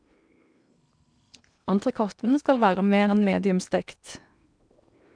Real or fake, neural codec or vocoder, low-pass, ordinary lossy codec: fake; codec, 16 kHz in and 24 kHz out, 0.8 kbps, FocalCodec, streaming, 65536 codes; 9.9 kHz; none